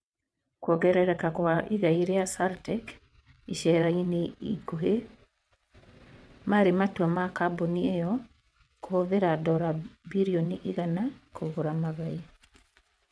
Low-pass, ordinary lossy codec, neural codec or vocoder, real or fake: none; none; vocoder, 22.05 kHz, 80 mel bands, WaveNeXt; fake